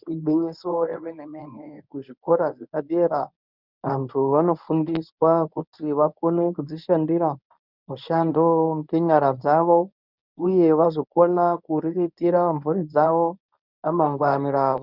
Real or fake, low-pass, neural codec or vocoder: fake; 5.4 kHz; codec, 24 kHz, 0.9 kbps, WavTokenizer, medium speech release version 1